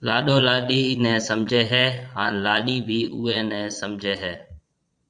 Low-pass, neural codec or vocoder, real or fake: 9.9 kHz; vocoder, 22.05 kHz, 80 mel bands, Vocos; fake